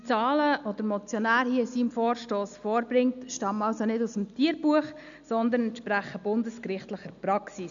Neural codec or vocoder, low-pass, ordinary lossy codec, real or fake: none; 7.2 kHz; none; real